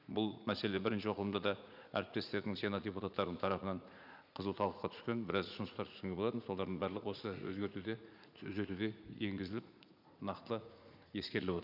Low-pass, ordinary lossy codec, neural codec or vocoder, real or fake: 5.4 kHz; none; vocoder, 44.1 kHz, 80 mel bands, Vocos; fake